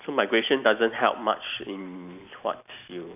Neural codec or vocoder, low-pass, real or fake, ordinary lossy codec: none; 3.6 kHz; real; none